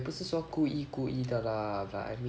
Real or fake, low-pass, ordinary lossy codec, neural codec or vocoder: real; none; none; none